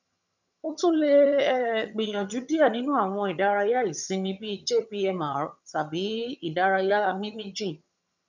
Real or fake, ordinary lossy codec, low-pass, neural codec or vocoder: fake; none; 7.2 kHz; vocoder, 22.05 kHz, 80 mel bands, HiFi-GAN